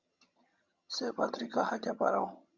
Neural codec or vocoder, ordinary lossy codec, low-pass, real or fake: vocoder, 22.05 kHz, 80 mel bands, HiFi-GAN; Opus, 64 kbps; 7.2 kHz; fake